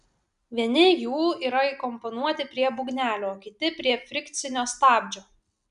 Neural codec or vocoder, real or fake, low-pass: none; real; 10.8 kHz